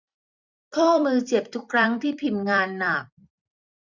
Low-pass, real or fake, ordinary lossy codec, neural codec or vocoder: 7.2 kHz; fake; none; vocoder, 44.1 kHz, 128 mel bands every 256 samples, BigVGAN v2